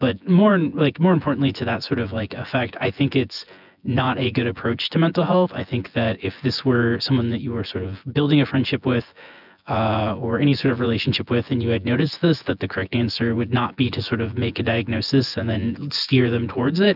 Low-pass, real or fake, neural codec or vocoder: 5.4 kHz; fake; vocoder, 24 kHz, 100 mel bands, Vocos